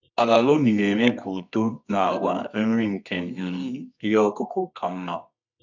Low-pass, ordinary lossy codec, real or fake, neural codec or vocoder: 7.2 kHz; none; fake; codec, 24 kHz, 0.9 kbps, WavTokenizer, medium music audio release